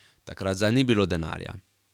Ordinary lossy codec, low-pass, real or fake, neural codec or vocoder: none; 19.8 kHz; fake; codec, 44.1 kHz, 7.8 kbps, DAC